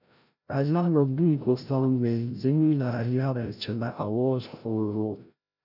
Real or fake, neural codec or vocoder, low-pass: fake; codec, 16 kHz, 0.5 kbps, FreqCodec, larger model; 5.4 kHz